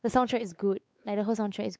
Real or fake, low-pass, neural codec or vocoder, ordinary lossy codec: fake; none; codec, 16 kHz, 4 kbps, X-Codec, WavLM features, trained on Multilingual LibriSpeech; none